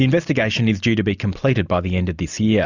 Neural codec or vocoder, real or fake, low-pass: none; real; 7.2 kHz